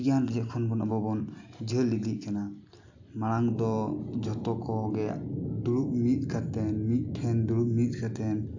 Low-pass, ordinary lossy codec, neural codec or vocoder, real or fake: 7.2 kHz; AAC, 48 kbps; none; real